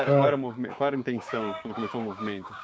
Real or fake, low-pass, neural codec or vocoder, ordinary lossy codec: fake; none; codec, 16 kHz, 6 kbps, DAC; none